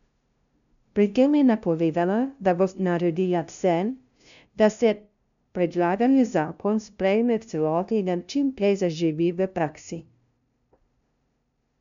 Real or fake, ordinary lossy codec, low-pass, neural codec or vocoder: fake; none; 7.2 kHz; codec, 16 kHz, 0.5 kbps, FunCodec, trained on LibriTTS, 25 frames a second